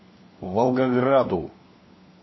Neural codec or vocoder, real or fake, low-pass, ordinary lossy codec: codec, 16 kHz, 4 kbps, FunCodec, trained on LibriTTS, 50 frames a second; fake; 7.2 kHz; MP3, 24 kbps